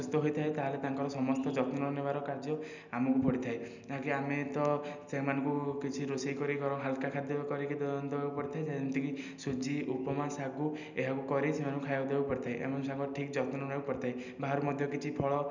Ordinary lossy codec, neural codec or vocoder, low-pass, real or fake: none; none; 7.2 kHz; real